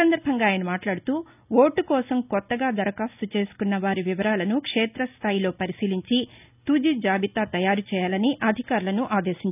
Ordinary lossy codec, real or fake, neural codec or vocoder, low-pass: none; real; none; 3.6 kHz